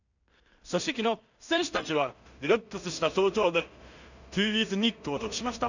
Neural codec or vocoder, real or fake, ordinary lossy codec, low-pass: codec, 16 kHz in and 24 kHz out, 0.4 kbps, LongCat-Audio-Codec, two codebook decoder; fake; none; 7.2 kHz